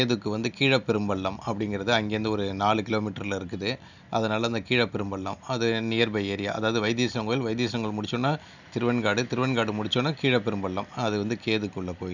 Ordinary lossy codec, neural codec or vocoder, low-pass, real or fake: none; none; 7.2 kHz; real